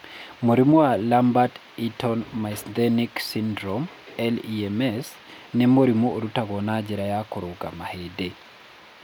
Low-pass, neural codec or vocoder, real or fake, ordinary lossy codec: none; none; real; none